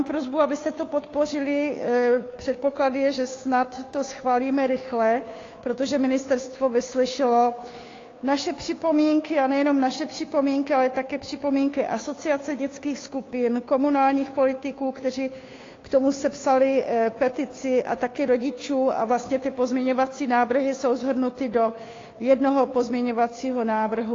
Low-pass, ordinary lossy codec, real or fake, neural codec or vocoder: 7.2 kHz; AAC, 32 kbps; fake; codec, 16 kHz, 2 kbps, FunCodec, trained on Chinese and English, 25 frames a second